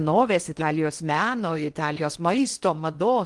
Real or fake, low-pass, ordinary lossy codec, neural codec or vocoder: fake; 10.8 kHz; Opus, 24 kbps; codec, 16 kHz in and 24 kHz out, 0.8 kbps, FocalCodec, streaming, 65536 codes